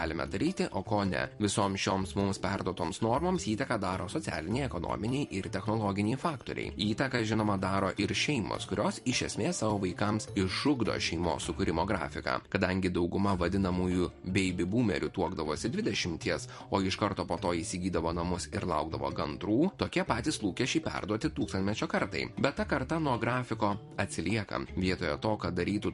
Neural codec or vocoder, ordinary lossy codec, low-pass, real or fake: vocoder, 44.1 kHz, 128 mel bands every 256 samples, BigVGAN v2; MP3, 48 kbps; 14.4 kHz; fake